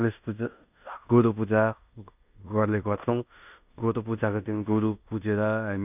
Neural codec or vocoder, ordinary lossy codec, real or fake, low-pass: codec, 16 kHz in and 24 kHz out, 0.9 kbps, LongCat-Audio-Codec, fine tuned four codebook decoder; none; fake; 3.6 kHz